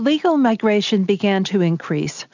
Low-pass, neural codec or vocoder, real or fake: 7.2 kHz; none; real